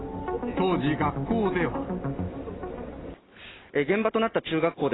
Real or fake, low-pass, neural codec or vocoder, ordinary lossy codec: real; 7.2 kHz; none; AAC, 16 kbps